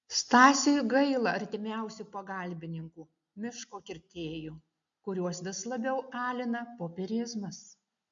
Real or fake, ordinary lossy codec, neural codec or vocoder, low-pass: real; MP3, 64 kbps; none; 7.2 kHz